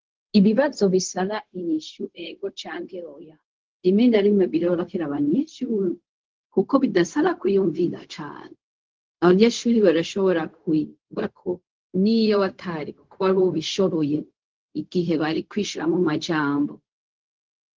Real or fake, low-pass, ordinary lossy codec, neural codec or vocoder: fake; 7.2 kHz; Opus, 16 kbps; codec, 16 kHz, 0.4 kbps, LongCat-Audio-Codec